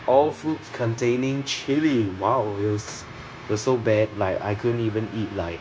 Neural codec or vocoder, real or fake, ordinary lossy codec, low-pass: codec, 16 kHz, 0.9 kbps, LongCat-Audio-Codec; fake; none; none